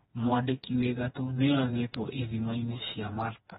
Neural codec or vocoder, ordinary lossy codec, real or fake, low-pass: codec, 16 kHz, 2 kbps, FreqCodec, smaller model; AAC, 16 kbps; fake; 7.2 kHz